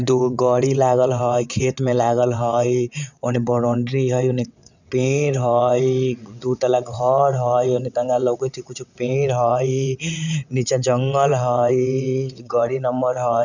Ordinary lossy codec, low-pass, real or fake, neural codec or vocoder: none; 7.2 kHz; fake; vocoder, 22.05 kHz, 80 mel bands, WaveNeXt